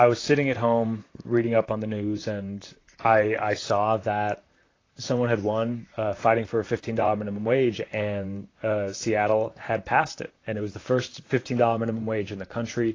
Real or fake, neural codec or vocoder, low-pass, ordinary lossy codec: fake; vocoder, 44.1 kHz, 128 mel bands, Pupu-Vocoder; 7.2 kHz; AAC, 32 kbps